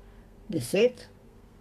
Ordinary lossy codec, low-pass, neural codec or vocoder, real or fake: MP3, 96 kbps; 14.4 kHz; codec, 32 kHz, 1.9 kbps, SNAC; fake